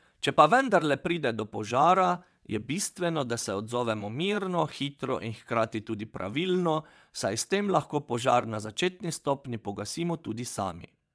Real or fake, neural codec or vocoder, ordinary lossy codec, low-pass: fake; vocoder, 22.05 kHz, 80 mel bands, WaveNeXt; none; none